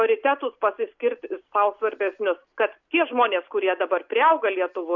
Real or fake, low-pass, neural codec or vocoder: real; 7.2 kHz; none